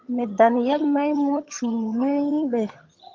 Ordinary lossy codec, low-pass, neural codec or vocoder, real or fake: Opus, 32 kbps; 7.2 kHz; vocoder, 22.05 kHz, 80 mel bands, HiFi-GAN; fake